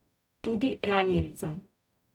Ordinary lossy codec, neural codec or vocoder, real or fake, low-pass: none; codec, 44.1 kHz, 0.9 kbps, DAC; fake; 19.8 kHz